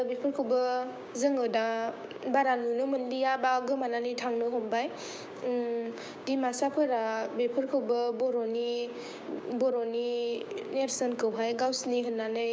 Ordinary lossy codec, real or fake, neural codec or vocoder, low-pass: none; fake; codec, 16 kHz, 6 kbps, DAC; none